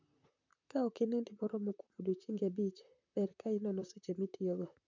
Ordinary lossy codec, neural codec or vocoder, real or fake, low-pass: MP3, 64 kbps; vocoder, 44.1 kHz, 128 mel bands, Pupu-Vocoder; fake; 7.2 kHz